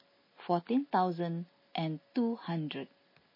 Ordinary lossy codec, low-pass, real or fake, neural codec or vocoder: MP3, 24 kbps; 5.4 kHz; real; none